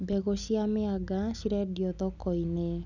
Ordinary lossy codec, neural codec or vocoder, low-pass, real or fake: none; none; 7.2 kHz; real